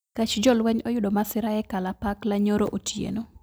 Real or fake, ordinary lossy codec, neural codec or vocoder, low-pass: real; none; none; none